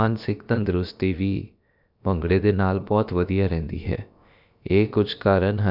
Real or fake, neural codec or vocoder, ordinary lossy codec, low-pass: fake; codec, 16 kHz, about 1 kbps, DyCAST, with the encoder's durations; none; 5.4 kHz